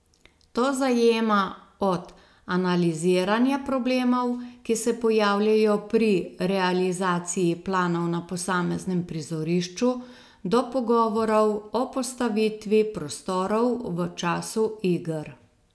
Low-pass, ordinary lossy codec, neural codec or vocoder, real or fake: none; none; none; real